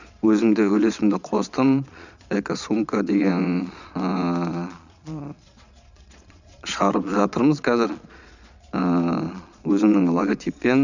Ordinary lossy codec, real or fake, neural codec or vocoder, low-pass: none; fake; vocoder, 22.05 kHz, 80 mel bands, Vocos; 7.2 kHz